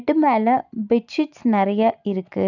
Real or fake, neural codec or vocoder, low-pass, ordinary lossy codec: real; none; 7.2 kHz; none